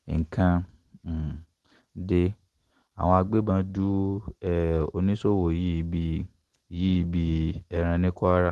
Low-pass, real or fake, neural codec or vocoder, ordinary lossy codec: 14.4 kHz; real; none; Opus, 16 kbps